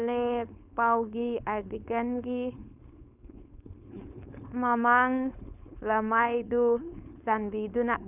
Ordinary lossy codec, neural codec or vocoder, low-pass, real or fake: none; codec, 16 kHz, 4.8 kbps, FACodec; 3.6 kHz; fake